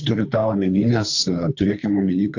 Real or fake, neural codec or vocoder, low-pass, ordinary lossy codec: fake; codec, 24 kHz, 3 kbps, HILCodec; 7.2 kHz; AAC, 48 kbps